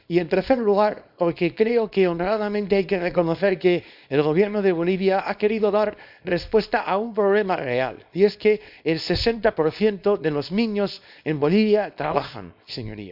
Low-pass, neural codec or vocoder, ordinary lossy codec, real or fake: 5.4 kHz; codec, 24 kHz, 0.9 kbps, WavTokenizer, small release; none; fake